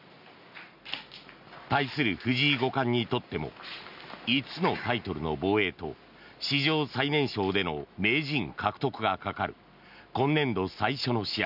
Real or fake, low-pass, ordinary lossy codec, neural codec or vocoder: real; 5.4 kHz; none; none